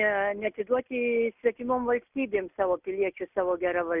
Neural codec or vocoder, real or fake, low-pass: none; real; 3.6 kHz